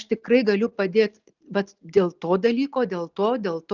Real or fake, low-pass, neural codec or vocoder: real; 7.2 kHz; none